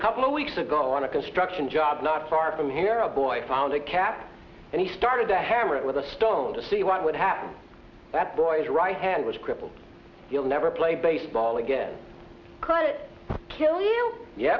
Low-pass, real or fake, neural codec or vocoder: 7.2 kHz; real; none